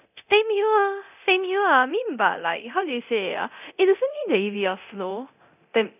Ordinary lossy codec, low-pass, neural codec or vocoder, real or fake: none; 3.6 kHz; codec, 24 kHz, 0.9 kbps, DualCodec; fake